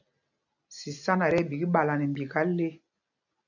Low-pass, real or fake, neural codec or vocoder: 7.2 kHz; real; none